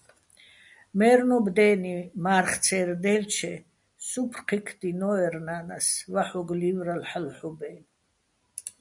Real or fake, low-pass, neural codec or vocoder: real; 10.8 kHz; none